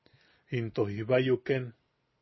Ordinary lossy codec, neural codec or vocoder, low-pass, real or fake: MP3, 24 kbps; none; 7.2 kHz; real